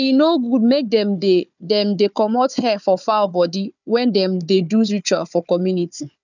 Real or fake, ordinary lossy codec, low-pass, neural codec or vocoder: fake; none; 7.2 kHz; codec, 16 kHz, 4 kbps, FunCodec, trained on Chinese and English, 50 frames a second